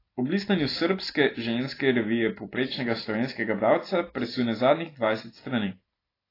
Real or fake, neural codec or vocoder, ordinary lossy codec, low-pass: real; none; AAC, 24 kbps; 5.4 kHz